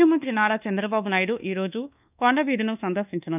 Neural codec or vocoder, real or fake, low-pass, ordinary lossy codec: codec, 24 kHz, 1.2 kbps, DualCodec; fake; 3.6 kHz; none